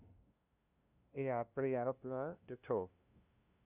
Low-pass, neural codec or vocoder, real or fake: 3.6 kHz; codec, 16 kHz, 0.5 kbps, FunCodec, trained on LibriTTS, 25 frames a second; fake